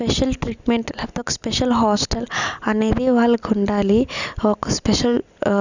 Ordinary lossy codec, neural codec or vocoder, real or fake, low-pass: none; none; real; 7.2 kHz